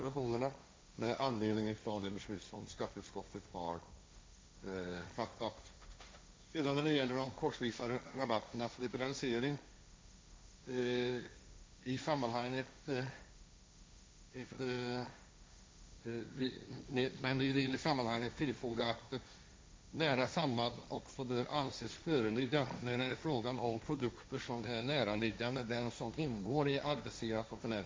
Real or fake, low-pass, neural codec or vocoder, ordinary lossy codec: fake; none; codec, 16 kHz, 1.1 kbps, Voila-Tokenizer; none